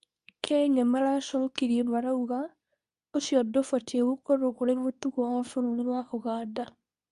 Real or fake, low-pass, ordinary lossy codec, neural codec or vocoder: fake; 10.8 kHz; Opus, 64 kbps; codec, 24 kHz, 0.9 kbps, WavTokenizer, medium speech release version 2